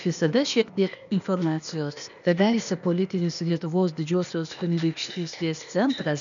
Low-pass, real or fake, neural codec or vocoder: 7.2 kHz; fake; codec, 16 kHz, 0.8 kbps, ZipCodec